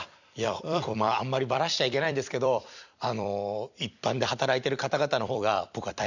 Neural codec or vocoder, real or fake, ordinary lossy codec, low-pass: vocoder, 44.1 kHz, 128 mel bands every 256 samples, BigVGAN v2; fake; none; 7.2 kHz